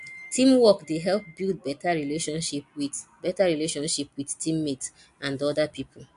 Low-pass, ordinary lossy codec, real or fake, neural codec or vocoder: 10.8 kHz; AAC, 64 kbps; real; none